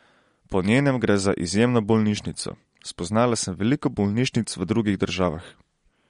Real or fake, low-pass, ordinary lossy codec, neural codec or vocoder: real; 10.8 kHz; MP3, 48 kbps; none